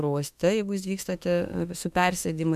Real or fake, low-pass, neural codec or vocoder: fake; 14.4 kHz; autoencoder, 48 kHz, 32 numbers a frame, DAC-VAE, trained on Japanese speech